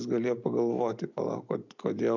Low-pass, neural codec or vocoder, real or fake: 7.2 kHz; none; real